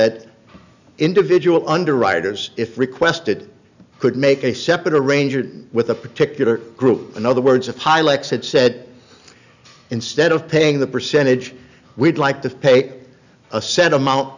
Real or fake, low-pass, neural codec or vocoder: real; 7.2 kHz; none